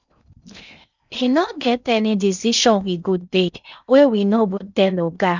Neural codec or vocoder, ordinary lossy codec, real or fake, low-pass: codec, 16 kHz in and 24 kHz out, 0.6 kbps, FocalCodec, streaming, 2048 codes; none; fake; 7.2 kHz